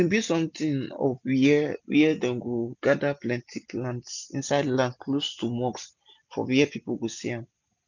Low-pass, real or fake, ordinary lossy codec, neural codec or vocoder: 7.2 kHz; real; none; none